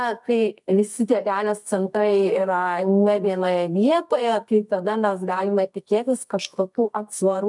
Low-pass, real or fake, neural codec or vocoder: 10.8 kHz; fake; codec, 24 kHz, 0.9 kbps, WavTokenizer, medium music audio release